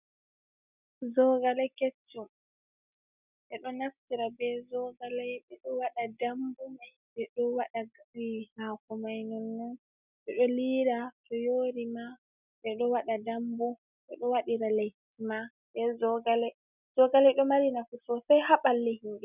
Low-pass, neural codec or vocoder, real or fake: 3.6 kHz; none; real